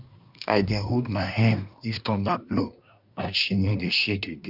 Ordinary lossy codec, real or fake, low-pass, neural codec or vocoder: none; fake; 5.4 kHz; codec, 24 kHz, 1 kbps, SNAC